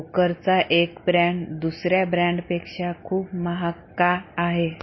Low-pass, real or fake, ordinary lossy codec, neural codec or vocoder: 7.2 kHz; real; MP3, 24 kbps; none